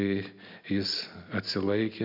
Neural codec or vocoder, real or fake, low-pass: none; real; 5.4 kHz